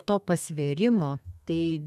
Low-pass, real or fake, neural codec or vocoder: 14.4 kHz; fake; codec, 32 kHz, 1.9 kbps, SNAC